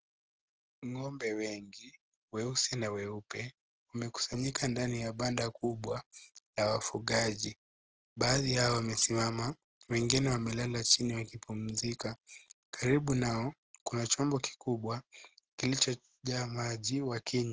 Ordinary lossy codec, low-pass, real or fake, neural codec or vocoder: Opus, 16 kbps; 7.2 kHz; real; none